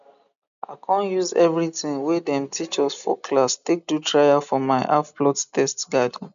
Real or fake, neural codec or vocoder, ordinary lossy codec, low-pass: real; none; none; 7.2 kHz